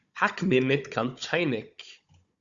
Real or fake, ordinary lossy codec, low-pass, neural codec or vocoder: fake; Opus, 64 kbps; 7.2 kHz; codec, 16 kHz, 16 kbps, FunCodec, trained on Chinese and English, 50 frames a second